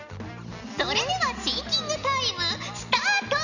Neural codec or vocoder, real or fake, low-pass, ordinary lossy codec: none; real; 7.2 kHz; none